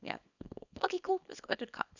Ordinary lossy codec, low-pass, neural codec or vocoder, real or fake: none; 7.2 kHz; codec, 24 kHz, 0.9 kbps, WavTokenizer, small release; fake